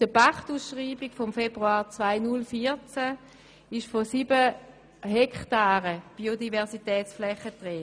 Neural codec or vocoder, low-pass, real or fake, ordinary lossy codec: none; none; real; none